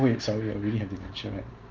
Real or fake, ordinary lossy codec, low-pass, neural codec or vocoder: real; Opus, 32 kbps; 7.2 kHz; none